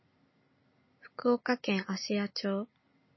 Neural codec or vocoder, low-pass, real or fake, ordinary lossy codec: none; 7.2 kHz; real; MP3, 24 kbps